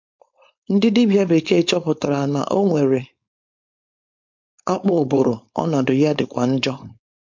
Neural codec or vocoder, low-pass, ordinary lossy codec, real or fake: codec, 16 kHz, 4.8 kbps, FACodec; 7.2 kHz; MP3, 48 kbps; fake